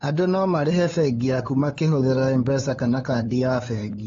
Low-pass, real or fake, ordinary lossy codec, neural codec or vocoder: 7.2 kHz; fake; AAC, 24 kbps; codec, 16 kHz, 8 kbps, FunCodec, trained on LibriTTS, 25 frames a second